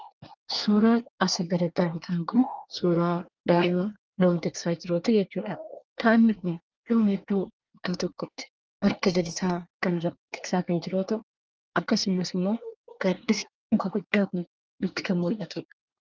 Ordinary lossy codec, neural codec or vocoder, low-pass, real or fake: Opus, 24 kbps; codec, 24 kHz, 1 kbps, SNAC; 7.2 kHz; fake